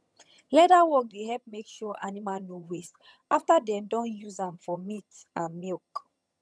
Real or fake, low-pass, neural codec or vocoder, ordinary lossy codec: fake; none; vocoder, 22.05 kHz, 80 mel bands, HiFi-GAN; none